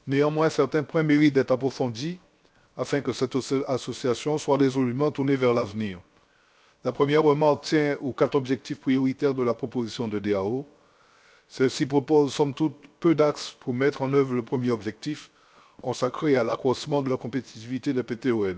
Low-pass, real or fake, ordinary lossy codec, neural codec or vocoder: none; fake; none; codec, 16 kHz, 0.7 kbps, FocalCodec